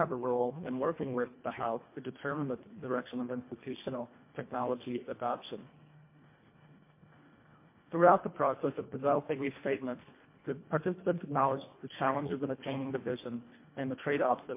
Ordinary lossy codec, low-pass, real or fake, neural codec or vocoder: MP3, 24 kbps; 3.6 kHz; fake; codec, 24 kHz, 1.5 kbps, HILCodec